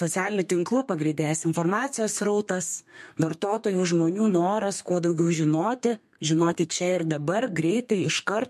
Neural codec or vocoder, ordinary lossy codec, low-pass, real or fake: codec, 32 kHz, 1.9 kbps, SNAC; MP3, 64 kbps; 14.4 kHz; fake